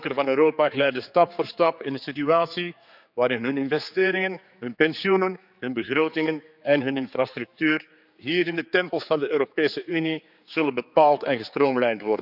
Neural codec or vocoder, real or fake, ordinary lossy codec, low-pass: codec, 16 kHz, 4 kbps, X-Codec, HuBERT features, trained on general audio; fake; none; 5.4 kHz